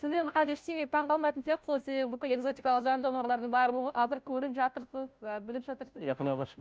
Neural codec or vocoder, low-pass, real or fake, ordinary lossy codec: codec, 16 kHz, 0.5 kbps, FunCodec, trained on Chinese and English, 25 frames a second; none; fake; none